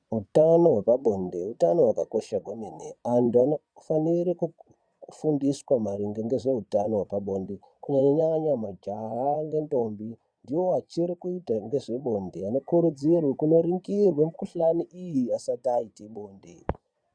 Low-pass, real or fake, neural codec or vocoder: 9.9 kHz; fake; vocoder, 22.05 kHz, 80 mel bands, Vocos